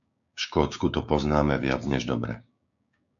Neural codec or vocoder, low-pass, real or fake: codec, 16 kHz, 6 kbps, DAC; 7.2 kHz; fake